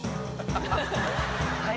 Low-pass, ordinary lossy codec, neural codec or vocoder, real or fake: none; none; none; real